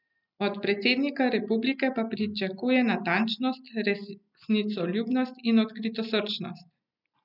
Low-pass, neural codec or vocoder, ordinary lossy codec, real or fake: 5.4 kHz; none; none; real